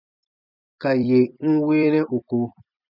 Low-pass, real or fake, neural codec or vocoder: 5.4 kHz; fake; vocoder, 44.1 kHz, 128 mel bands every 256 samples, BigVGAN v2